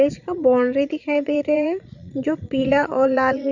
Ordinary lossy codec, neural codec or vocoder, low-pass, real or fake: none; vocoder, 22.05 kHz, 80 mel bands, Vocos; 7.2 kHz; fake